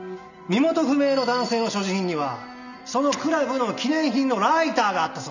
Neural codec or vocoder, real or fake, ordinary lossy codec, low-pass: none; real; none; 7.2 kHz